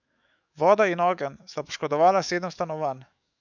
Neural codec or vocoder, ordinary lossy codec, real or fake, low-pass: autoencoder, 48 kHz, 128 numbers a frame, DAC-VAE, trained on Japanese speech; none; fake; 7.2 kHz